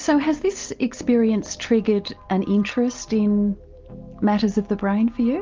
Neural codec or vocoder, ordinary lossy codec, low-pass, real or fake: none; Opus, 24 kbps; 7.2 kHz; real